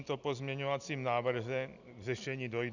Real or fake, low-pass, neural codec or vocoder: real; 7.2 kHz; none